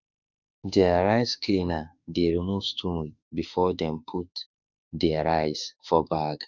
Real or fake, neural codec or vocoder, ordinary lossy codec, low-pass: fake; autoencoder, 48 kHz, 32 numbers a frame, DAC-VAE, trained on Japanese speech; none; 7.2 kHz